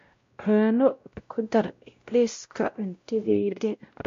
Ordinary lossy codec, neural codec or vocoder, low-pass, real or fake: MP3, 48 kbps; codec, 16 kHz, 0.5 kbps, X-Codec, WavLM features, trained on Multilingual LibriSpeech; 7.2 kHz; fake